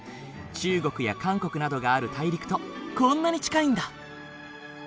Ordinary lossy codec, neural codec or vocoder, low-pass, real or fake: none; none; none; real